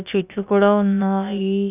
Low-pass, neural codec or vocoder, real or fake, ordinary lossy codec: 3.6 kHz; codec, 16 kHz, about 1 kbps, DyCAST, with the encoder's durations; fake; none